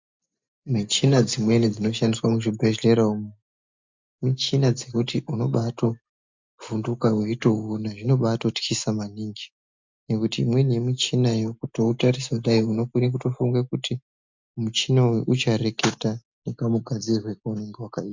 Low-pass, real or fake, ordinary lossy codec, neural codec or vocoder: 7.2 kHz; real; MP3, 64 kbps; none